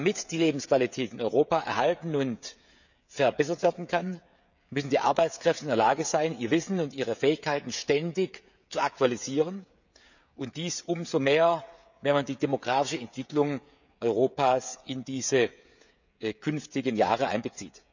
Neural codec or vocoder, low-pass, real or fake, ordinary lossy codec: codec, 16 kHz, 16 kbps, FreqCodec, smaller model; 7.2 kHz; fake; none